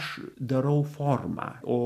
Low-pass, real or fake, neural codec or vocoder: 14.4 kHz; real; none